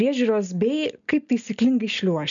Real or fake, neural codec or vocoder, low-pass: real; none; 7.2 kHz